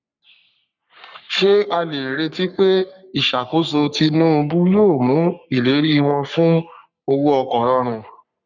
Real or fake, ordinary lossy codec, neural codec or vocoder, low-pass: fake; none; codec, 44.1 kHz, 3.4 kbps, Pupu-Codec; 7.2 kHz